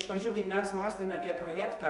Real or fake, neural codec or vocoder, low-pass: fake; codec, 24 kHz, 0.9 kbps, WavTokenizer, medium music audio release; 10.8 kHz